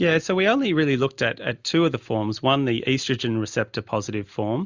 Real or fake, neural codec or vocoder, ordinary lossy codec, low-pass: real; none; Opus, 64 kbps; 7.2 kHz